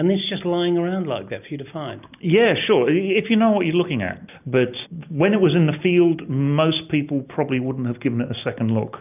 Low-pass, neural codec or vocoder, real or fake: 3.6 kHz; none; real